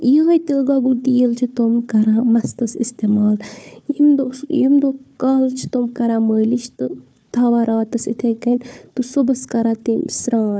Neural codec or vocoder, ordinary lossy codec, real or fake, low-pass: codec, 16 kHz, 4 kbps, FunCodec, trained on Chinese and English, 50 frames a second; none; fake; none